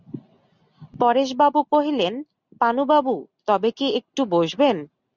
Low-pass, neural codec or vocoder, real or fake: 7.2 kHz; none; real